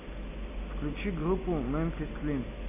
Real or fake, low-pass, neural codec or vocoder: real; 3.6 kHz; none